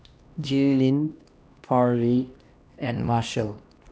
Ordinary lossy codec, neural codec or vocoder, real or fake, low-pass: none; codec, 16 kHz, 1 kbps, X-Codec, HuBERT features, trained on LibriSpeech; fake; none